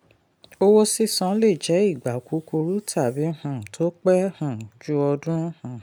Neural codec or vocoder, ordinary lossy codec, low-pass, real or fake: none; none; none; real